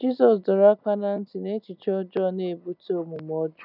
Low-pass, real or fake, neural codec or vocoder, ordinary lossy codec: 5.4 kHz; real; none; none